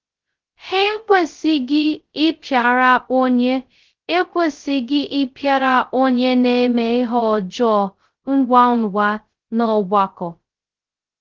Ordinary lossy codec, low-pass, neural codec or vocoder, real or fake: Opus, 16 kbps; 7.2 kHz; codec, 16 kHz, 0.2 kbps, FocalCodec; fake